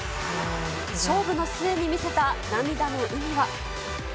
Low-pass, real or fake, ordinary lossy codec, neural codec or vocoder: none; real; none; none